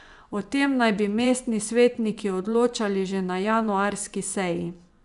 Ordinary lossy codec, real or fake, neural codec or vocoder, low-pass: none; fake; vocoder, 24 kHz, 100 mel bands, Vocos; 10.8 kHz